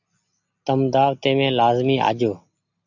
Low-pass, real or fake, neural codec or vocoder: 7.2 kHz; real; none